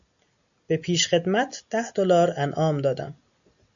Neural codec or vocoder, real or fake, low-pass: none; real; 7.2 kHz